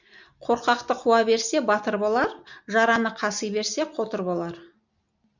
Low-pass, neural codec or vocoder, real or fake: 7.2 kHz; none; real